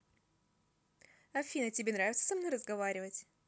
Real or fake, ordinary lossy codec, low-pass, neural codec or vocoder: real; none; none; none